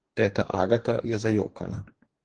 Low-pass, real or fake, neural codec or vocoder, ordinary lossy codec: 9.9 kHz; fake; codec, 44.1 kHz, 2.6 kbps, DAC; Opus, 16 kbps